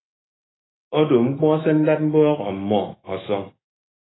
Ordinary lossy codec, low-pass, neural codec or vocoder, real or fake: AAC, 16 kbps; 7.2 kHz; autoencoder, 48 kHz, 128 numbers a frame, DAC-VAE, trained on Japanese speech; fake